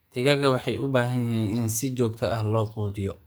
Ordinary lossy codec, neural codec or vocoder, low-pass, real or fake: none; codec, 44.1 kHz, 2.6 kbps, SNAC; none; fake